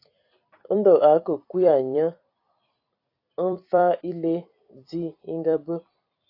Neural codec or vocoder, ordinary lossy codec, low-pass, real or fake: vocoder, 44.1 kHz, 128 mel bands every 256 samples, BigVGAN v2; AAC, 32 kbps; 5.4 kHz; fake